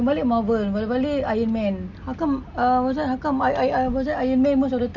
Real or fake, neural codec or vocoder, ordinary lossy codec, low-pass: real; none; none; 7.2 kHz